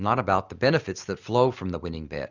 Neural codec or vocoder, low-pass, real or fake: none; 7.2 kHz; real